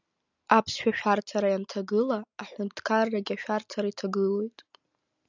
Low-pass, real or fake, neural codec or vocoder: 7.2 kHz; real; none